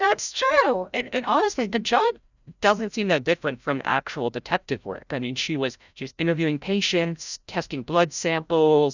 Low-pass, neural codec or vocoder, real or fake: 7.2 kHz; codec, 16 kHz, 0.5 kbps, FreqCodec, larger model; fake